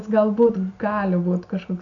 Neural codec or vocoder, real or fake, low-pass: none; real; 7.2 kHz